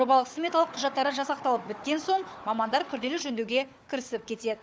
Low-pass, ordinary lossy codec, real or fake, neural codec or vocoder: none; none; fake; codec, 16 kHz, 4 kbps, FunCodec, trained on Chinese and English, 50 frames a second